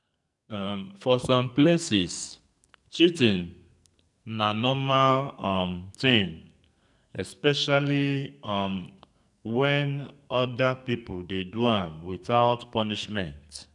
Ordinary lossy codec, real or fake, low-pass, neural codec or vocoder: none; fake; 10.8 kHz; codec, 44.1 kHz, 2.6 kbps, SNAC